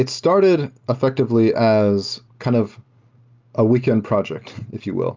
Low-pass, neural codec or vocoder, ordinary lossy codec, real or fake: 7.2 kHz; none; Opus, 24 kbps; real